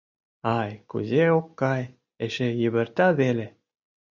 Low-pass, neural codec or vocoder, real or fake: 7.2 kHz; none; real